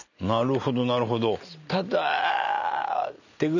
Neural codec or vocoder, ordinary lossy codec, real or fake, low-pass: none; none; real; 7.2 kHz